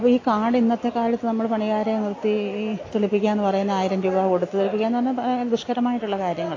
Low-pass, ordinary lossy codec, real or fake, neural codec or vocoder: 7.2 kHz; AAC, 32 kbps; real; none